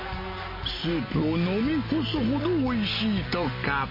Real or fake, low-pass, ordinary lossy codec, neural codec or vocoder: real; 5.4 kHz; MP3, 24 kbps; none